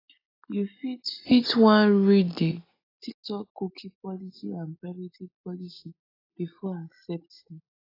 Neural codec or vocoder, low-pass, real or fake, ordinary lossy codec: none; 5.4 kHz; real; AAC, 24 kbps